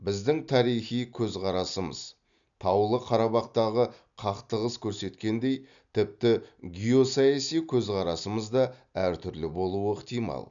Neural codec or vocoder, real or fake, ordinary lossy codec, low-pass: none; real; none; 7.2 kHz